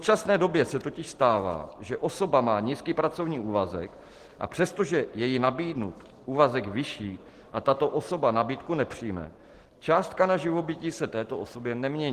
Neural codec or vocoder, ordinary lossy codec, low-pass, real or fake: none; Opus, 16 kbps; 14.4 kHz; real